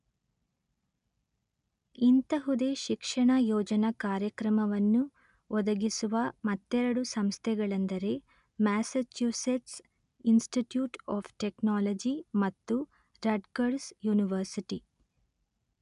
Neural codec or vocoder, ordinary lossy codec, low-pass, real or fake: none; none; 10.8 kHz; real